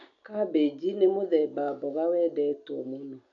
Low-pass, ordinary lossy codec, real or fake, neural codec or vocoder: 7.2 kHz; none; real; none